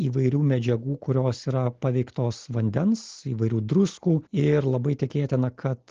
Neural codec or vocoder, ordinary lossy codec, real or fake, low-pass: none; Opus, 16 kbps; real; 7.2 kHz